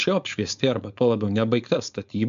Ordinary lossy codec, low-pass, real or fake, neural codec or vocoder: AAC, 96 kbps; 7.2 kHz; fake; codec, 16 kHz, 4.8 kbps, FACodec